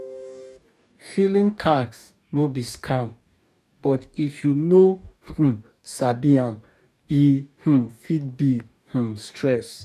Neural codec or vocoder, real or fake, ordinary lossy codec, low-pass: codec, 44.1 kHz, 2.6 kbps, DAC; fake; none; 14.4 kHz